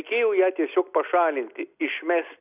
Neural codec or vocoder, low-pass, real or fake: none; 3.6 kHz; real